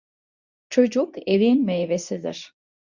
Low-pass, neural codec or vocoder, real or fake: 7.2 kHz; codec, 24 kHz, 0.9 kbps, WavTokenizer, medium speech release version 2; fake